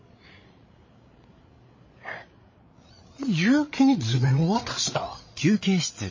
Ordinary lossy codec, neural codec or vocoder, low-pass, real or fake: MP3, 32 kbps; codec, 16 kHz, 8 kbps, FreqCodec, larger model; 7.2 kHz; fake